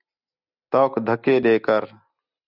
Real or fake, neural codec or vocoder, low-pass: real; none; 5.4 kHz